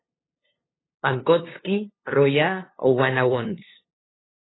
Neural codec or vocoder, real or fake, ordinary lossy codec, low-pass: codec, 16 kHz, 2 kbps, FunCodec, trained on LibriTTS, 25 frames a second; fake; AAC, 16 kbps; 7.2 kHz